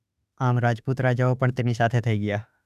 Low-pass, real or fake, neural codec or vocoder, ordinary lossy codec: 14.4 kHz; fake; autoencoder, 48 kHz, 32 numbers a frame, DAC-VAE, trained on Japanese speech; none